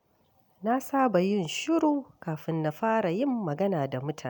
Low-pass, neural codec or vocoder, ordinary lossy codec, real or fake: none; none; none; real